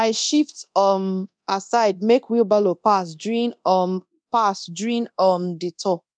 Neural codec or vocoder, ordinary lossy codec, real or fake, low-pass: codec, 24 kHz, 0.9 kbps, DualCodec; MP3, 96 kbps; fake; 9.9 kHz